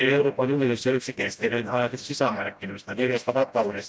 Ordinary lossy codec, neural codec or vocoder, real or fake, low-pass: none; codec, 16 kHz, 0.5 kbps, FreqCodec, smaller model; fake; none